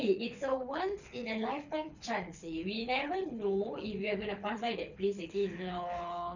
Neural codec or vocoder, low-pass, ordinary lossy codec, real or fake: codec, 24 kHz, 6 kbps, HILCodec; 7.2 kHz; none; fake